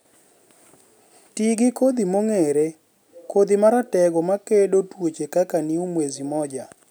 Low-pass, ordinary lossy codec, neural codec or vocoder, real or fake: none; none; none; real